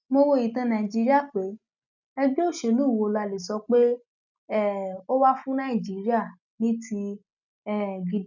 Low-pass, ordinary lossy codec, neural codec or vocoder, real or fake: 7.2 kHz; none; none; real